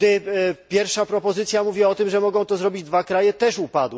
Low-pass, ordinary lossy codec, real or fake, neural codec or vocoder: none; none; real; none